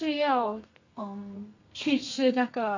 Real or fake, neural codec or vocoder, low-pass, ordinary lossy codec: fake; codec, 32 kHz, 1.9 kbps, SNAC; 7.2 kHz; AAC, 48 kbps